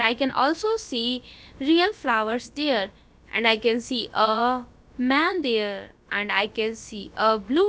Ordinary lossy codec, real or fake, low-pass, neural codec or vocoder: none; fake; none; codec, 16 kHz, about 1 kbps, DyCAST, with the encoder's durations